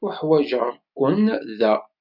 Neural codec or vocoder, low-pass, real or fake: none; 5.4 kHz; real